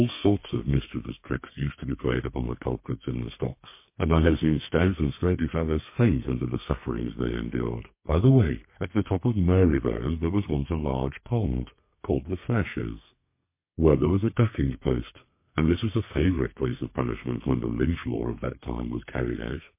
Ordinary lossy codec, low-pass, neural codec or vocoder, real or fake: MP3, 24 kbps; 3.6 kHz; codec, 32 kHz, 1.9 kbps, SNAC; fake